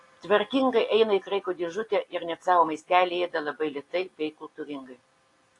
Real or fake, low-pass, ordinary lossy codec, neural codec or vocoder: real; 10.8 kHz; AAC, 48 kbps; none